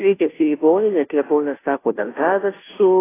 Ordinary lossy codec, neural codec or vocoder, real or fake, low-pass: AAC, 16 kbps; codec, 16 kHz, 0.5 kbps, FunCodec, trained on Chinese and English, 25 frames a second; fake; 3.6 kHz